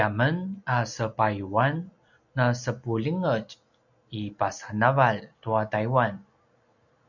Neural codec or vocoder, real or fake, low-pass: none; real; 7.2 kHz